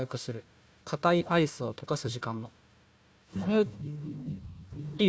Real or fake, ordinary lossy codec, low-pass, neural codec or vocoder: fake; none; none; codec, 16 kHz, 1 kbps, FunCodec, trained on Chinese and English, 50 frames a second